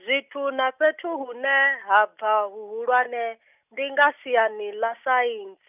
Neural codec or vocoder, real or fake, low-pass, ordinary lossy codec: none; real; 3.6 kHz; none